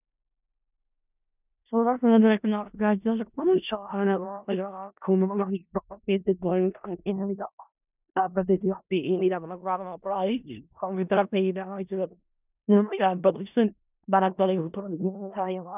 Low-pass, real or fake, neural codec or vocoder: 3.6 kHz; fake; codec, 16 kHz in and 24 kHz out, 0.4 kbps, LongCat-Audio-Codec, four codebook decoder